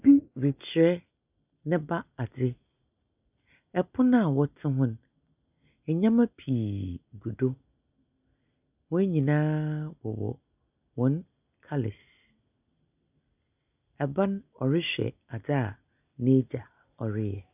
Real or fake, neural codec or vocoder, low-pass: real; none; 3.6 kHz